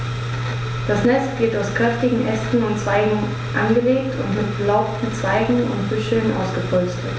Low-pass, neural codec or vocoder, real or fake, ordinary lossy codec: none; none; real; none